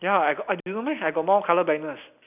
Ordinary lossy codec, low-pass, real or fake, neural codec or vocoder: none; 3.6 kHz; real; none